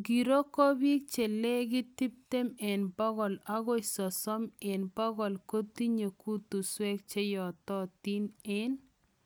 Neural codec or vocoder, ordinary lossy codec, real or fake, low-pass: none; none; real; none